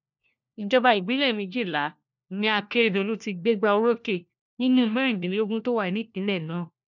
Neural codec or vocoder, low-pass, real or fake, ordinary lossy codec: codec, 16 kHz, 1 kbps, FunCodec, trained on LibriTTS, 50 frames a second; 7.2 kHz; fake; none